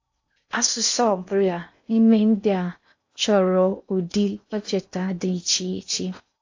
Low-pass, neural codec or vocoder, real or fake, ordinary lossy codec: 7.2 kHz; codec, 16 kHz in and 24 kHz out, 0.6 kbps, FocalCodec, streaming, 4096 codes; fake; AAC, 48 kbps